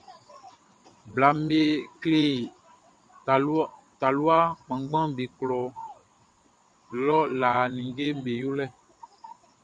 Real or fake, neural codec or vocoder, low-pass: fake; vocoder, 22.05 kHz, 80 mel bands, WaveNeXt; 9.9 kHz